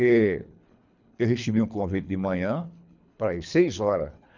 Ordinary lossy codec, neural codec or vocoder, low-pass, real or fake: none; codec, 24 kHz, 3 kbps, HILCodec; 7.2 kHz; fake